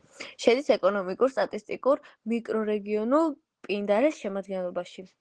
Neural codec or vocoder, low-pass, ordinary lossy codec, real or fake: none; 9.9 kHz; Opus, 16 kbps; real